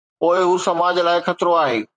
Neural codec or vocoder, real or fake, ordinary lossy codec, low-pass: vocoder, 44.1 kHz, 128 mel bands, Pupu-Vocoder; fake; MP3, 96 kbps; 9.9 kHz